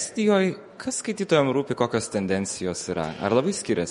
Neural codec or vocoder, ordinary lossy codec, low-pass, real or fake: autoencoder, 48 kHz, 128 numbers a frame, DAC-VAE, trained on Japanese speech; MP3, 48 kbps; 19.8 kHz; fake